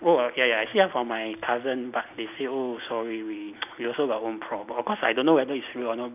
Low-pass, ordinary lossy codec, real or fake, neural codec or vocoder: 3.6 kHz; none; real; none